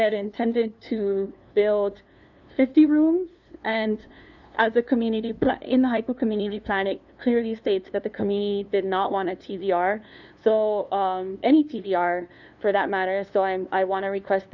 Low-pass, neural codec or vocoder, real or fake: 7.2 kHz; codec, 16 kHz, 2 kbps, FunCodec, trained on LibriTTS, 25 frames a second; fake